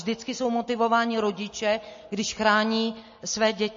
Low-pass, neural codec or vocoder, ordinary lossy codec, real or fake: 7.2 kHz; none; MP3, 32 kbps; real